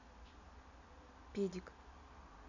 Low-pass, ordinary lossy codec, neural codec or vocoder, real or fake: 7.2 kHz; none; none; real